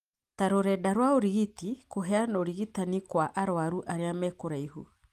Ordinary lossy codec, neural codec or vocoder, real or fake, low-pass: Opus, 32 kbps; none; real; 14.4 kHz